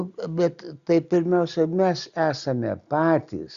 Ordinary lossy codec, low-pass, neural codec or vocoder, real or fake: Opus, 64 kbps; 7.2 kHz; none; real